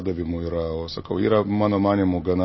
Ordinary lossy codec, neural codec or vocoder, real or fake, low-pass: MP3, 24 kbps; none; real; 7.2 kHz